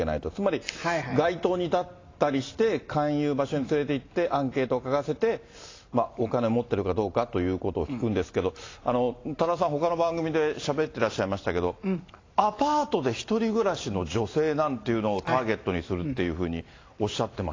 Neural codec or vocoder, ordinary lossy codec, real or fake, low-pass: none; AAC, 32 kbps; real; 7.2 kHz